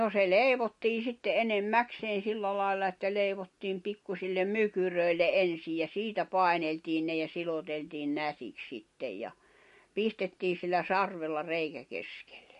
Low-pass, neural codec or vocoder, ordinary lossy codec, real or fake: 14.4 kHz; none; MP3, 48 kbps; real